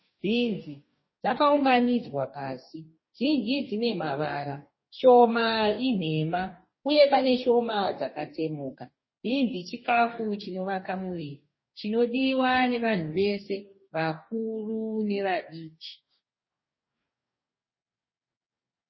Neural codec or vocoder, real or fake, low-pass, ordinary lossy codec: codec, 44.1 kHz, 2.6 kbps, DAC; fake; 7.2 kHz; MP3, 24 kbps